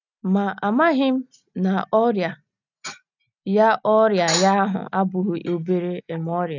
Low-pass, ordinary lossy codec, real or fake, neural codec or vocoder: 7.2 kHz; none; real; none